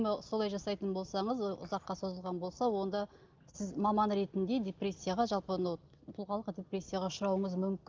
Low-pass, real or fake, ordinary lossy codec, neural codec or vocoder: 7.2 kHz; real; Opus, 32 kbps; none